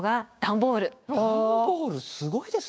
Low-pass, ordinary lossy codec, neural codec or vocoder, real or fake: none; none; codec, 16 kHz, 6 kbps, DAC; fake